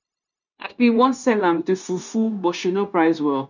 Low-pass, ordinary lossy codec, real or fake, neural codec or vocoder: 7.2 kHz; none; fake; codec, 16 kHz, 0.9 kbps, LongCat-Audio-Codec